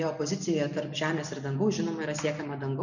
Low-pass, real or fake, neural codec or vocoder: 7.2 kHz; real; none